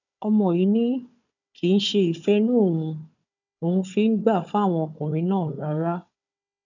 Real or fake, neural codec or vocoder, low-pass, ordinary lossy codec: fake; codec, 16 kHz, 4 kbps, FunCodec, trained on Chinese and English, 50 frames a second; 7.2 kHz; none